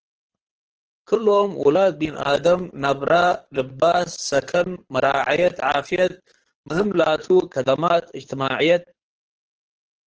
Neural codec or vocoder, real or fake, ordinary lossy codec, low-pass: codec, 24 kHz, 6 kbps, HILCodec; fake; Opus, 16 kbps; 7.2 kHz